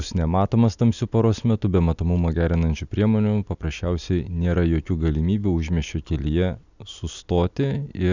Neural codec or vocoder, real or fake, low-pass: none; real; 7.2 kHz